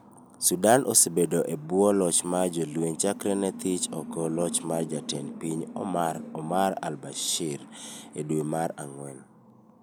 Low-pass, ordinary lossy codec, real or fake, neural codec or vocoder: none; none; real; none